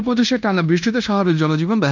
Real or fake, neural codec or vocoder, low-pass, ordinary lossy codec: fake; codec, 16 kHz in and 24 kHz out, 0.9 kbps, LongCat-Audio-Codec, fine tuned four codebook decoder; 7.2 kHz; none